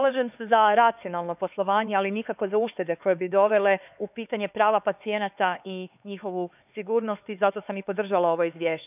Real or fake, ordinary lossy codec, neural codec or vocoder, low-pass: fake; none; codec, 16 kHz, 4 kbps, X-Codec, HuBERT features, trained on LibriSpeech; 3.6 kHz